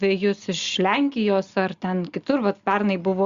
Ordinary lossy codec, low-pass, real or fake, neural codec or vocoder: Opus, 64 kbps; 7.2 kHz; real; none